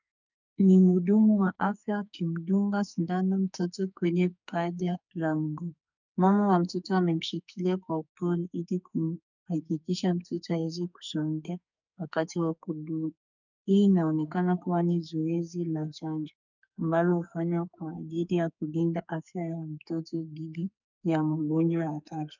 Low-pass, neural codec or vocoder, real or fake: 7.2 kHz; codec, 44.1 kHz, 2.6 kbps, SNAC; fake